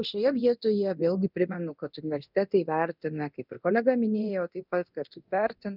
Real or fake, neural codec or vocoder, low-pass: fake; codec, 24 kHz, 0.9 kbps, DualCodec; 5.4 kHz